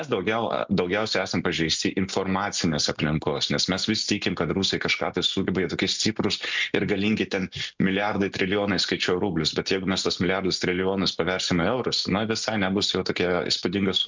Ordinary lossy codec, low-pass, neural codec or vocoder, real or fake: MP3, 64 kbps; 7.2 kHz; none; real